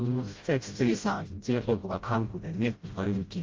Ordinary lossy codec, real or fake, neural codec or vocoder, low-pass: Opus, 32 kbps; fake; codec, 16 kHz, 0.5 kbps, FreqCodec, smaller model; 7.2 kHz